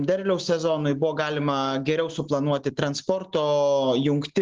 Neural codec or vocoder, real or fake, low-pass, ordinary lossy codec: none; real; 7.2 kHz; Opus, 16 kbps